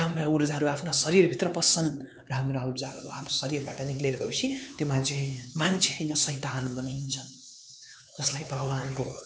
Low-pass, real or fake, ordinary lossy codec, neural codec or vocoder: none; fake; none; codec, 16 kHz, 4 kbps, X-Codec, HuBERT features, trained on LibriSpeech